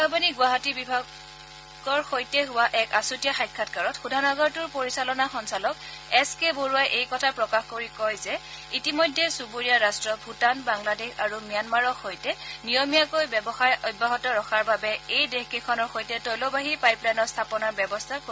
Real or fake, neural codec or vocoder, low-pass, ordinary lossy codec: real; none; none; none